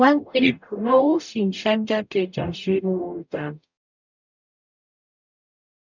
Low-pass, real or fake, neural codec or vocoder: 7.2 kHz; fake; codec, 44.1 kHz, 0.9 kbps, DAC